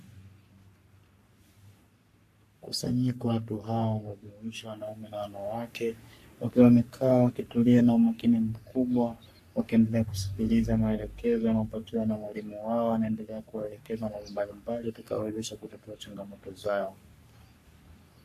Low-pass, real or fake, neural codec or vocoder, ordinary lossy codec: 14.4 kHz; fake; codec, 44.1 kHz, 3.4 kbps, Pupu-Codec; AAC, 64 kbps